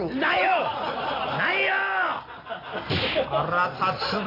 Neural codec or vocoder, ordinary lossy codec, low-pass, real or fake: none; AAC, 24 kbps; 5.4 kHz; real